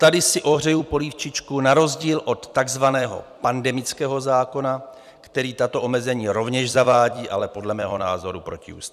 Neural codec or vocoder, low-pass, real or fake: vocoder, 44.1 kHz, 128 mel bands every 256 samples, BigVGAN v2; 14.4 kHz; fake